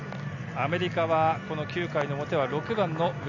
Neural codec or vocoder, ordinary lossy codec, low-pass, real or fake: none; none; 7.2 kHz; real